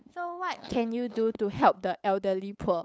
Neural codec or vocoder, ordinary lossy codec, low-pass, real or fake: codec, 16 kHz, 8 kbps, FunCodec, trained on LibriTTS, 25 frames a second; none; none; fake